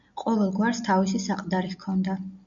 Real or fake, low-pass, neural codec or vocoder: real; 7.2 kHz; none